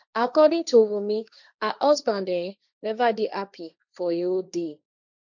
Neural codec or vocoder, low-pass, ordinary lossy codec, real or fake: codec, 16 kHz, 1.1 kbps, Voila-Tokenizer; 7.2 kHz; none; fake